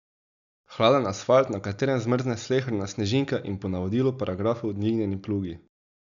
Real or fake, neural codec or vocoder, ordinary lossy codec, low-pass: real; none; none; 7.2 kHz